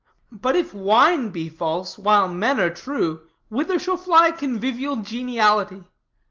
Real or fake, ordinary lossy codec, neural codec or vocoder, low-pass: real; Opus, 32 kbps; none; 7.2 kHz